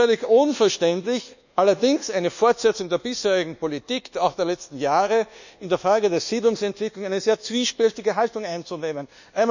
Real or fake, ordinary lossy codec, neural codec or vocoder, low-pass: fake; none; codec, 24 kHz, 1.2 kbps, DualCodec; 7.2 kHz